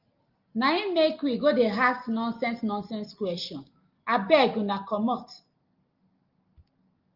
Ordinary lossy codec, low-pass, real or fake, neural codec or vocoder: Opus, 32 kbps; 5.4 kHz; real; none